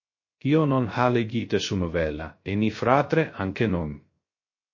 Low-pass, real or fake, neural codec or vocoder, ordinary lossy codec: 7.2 kHz; fake; codec, 16 kHz, 0.3 kbps, FocalCodec; MP3, 32 kbps